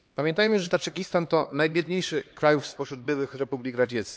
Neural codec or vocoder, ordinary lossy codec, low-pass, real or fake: codec, 16 kHz, 2 kbps, X-Codec, HuBERT features, trained on LibriSpeech; none; none; fake